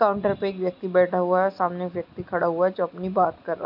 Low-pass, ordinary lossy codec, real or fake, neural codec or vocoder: 5.4 kHz; MP3, 48 kbps; real; none